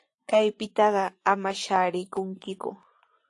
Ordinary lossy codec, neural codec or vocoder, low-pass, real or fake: AAC, 48 kbps; vocoder, 24 kHz, 100 mel bands, Vocos; 10.8 kHz; fake